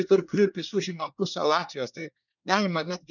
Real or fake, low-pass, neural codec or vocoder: fake; 7.2 kHz; codec, 24 kHz, 1 kbps, SNAC